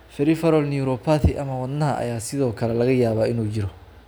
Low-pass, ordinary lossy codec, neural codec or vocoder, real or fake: none; none; none; real